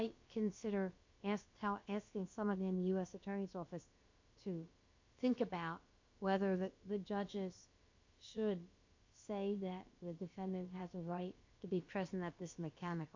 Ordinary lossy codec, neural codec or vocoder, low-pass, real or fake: MP3, 48 kbps; codec, 16 kHz, about 1 kbps, DyCAST, with the encoder's durations; 7.2 kHz; fake